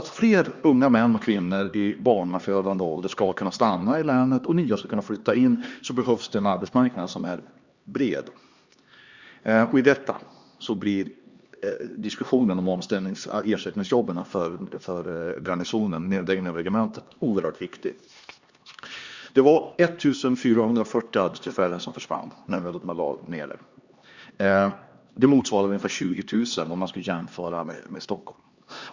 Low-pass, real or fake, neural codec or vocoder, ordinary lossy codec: 7.2 kHz; fake; codec, 16 kHz, 2 kbps, X-Codec, HuBERT features, trained on LibriSpeech; Opus, 64 kbps